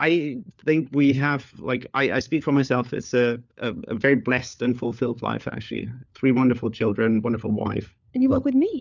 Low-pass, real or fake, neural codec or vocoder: 7.2 kHz; fake; codec, 16 kHz, 4 kbps, FunCodec, trained on LibriTTS, 50 frames a second